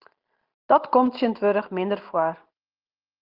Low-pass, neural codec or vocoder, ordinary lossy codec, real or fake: 5.4 kHz; none; Opus, 32 kbps; real